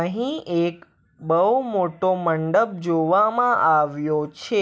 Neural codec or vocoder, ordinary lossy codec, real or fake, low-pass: none; none; real; none